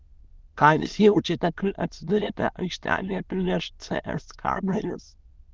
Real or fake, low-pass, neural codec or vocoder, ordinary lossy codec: fake; 7.2 kHz; autoencoder, 22.05 kHz, a latent of 192 numbers a frame, VITS, trained on many speakers; Opus, 32 kbps